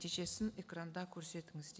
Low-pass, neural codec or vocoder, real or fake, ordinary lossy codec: none; none; real; none